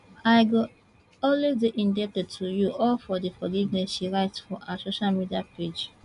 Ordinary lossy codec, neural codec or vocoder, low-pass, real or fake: none; none; 10.8 kHz; real